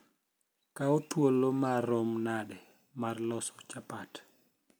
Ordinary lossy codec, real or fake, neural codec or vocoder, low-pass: none; real; none; none